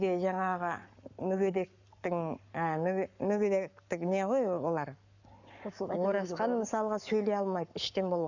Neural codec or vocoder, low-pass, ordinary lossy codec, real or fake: codec, 44.1 kHz, 7.8 kbps, Pupu-Codec; 7.2 kHz; none; fake